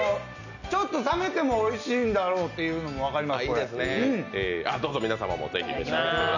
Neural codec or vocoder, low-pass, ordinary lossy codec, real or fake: none; 7.2 kHz; none; real